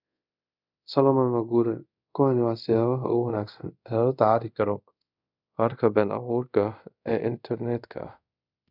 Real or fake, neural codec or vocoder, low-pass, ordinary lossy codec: fake; codec, 24 kHz, 0.5 kbps, DualCodec; 5.4 kHz; none